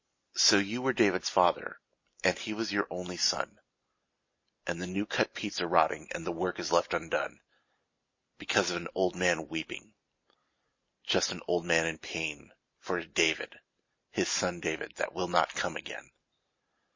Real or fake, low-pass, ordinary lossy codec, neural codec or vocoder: real; 7.2 kHz; MP3, 32 kbps; none